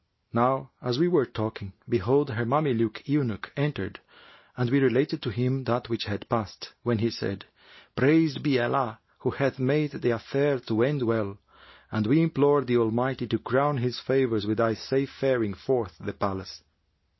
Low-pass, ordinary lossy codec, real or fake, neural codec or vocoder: 7.2 kHz; MP3, 24 kbps; real; none